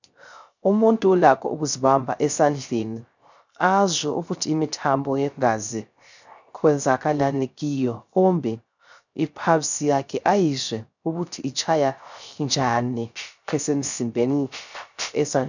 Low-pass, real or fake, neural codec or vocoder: 7.2 kHz; fake; codec, 16 kHz, 0.3 kbps, FocalCodec